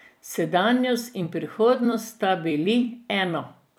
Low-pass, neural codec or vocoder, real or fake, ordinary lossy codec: none; vocoder, 44.1 kHz, 128 mel bands every 256 samples, BigVGAN v2; fake; none